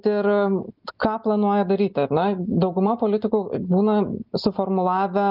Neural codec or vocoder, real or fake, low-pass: none; real; 5.4 kHz